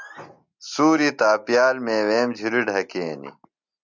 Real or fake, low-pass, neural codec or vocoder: real; 7.2 kHz; none